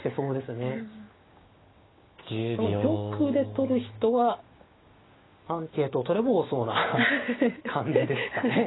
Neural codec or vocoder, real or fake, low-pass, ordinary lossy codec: codec, 16 kHz, 6 kbps, DAC; fake; 7.2 kHz; AAC, 16 kbps